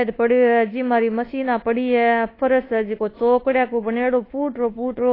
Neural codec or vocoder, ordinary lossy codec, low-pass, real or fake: codec, 24 kHz, 1.2 kbps, DualCodec; AAC, 24 kbps; 5.4 kHz; fake